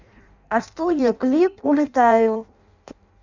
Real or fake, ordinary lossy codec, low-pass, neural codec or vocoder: fake; none; 7.2 kHz; codec, 16 kHz in and 24 kHz out, 0.6 kbps, FireRedTTS-2 codec